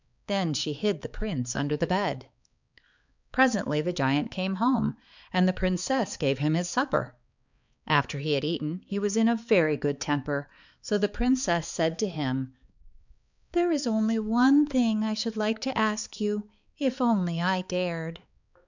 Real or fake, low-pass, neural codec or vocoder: fake; 7.2 kHz; codec, 16 kHz, 4 kbps, X-Codec, HuBERT features, trained on balanced general audio